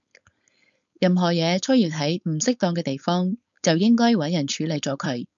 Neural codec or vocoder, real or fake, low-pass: codec, 16 kHz, 4.8 kbps, FACodec; fake; 7.2 kHz